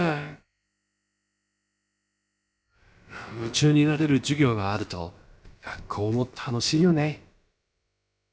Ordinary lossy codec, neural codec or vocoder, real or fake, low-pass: none; codec, 16 kHz, about 1 kbps, DyCAST, with the encoder's durations; fake; none